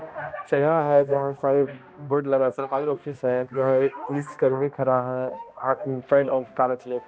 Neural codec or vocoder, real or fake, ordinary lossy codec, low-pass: codec, 16 kHz, 1 kbps, X-Codec, HuBERT features, trained on balanced general audio; fake; none; none